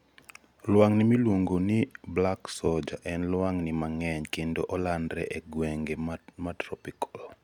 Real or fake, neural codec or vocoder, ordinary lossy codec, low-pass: real; none; none; 19.8 kHz